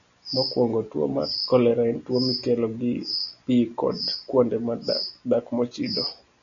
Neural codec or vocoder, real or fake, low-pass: none; real; 7.2 kHz